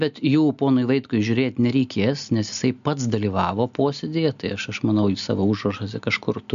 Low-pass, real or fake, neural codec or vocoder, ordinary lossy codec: 7.2 kHz; real; none; AAC, 96 kbps